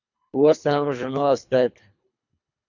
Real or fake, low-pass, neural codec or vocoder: fake; 7.2 kHz; codec, 24 kHz, 3 kbps, HILCodec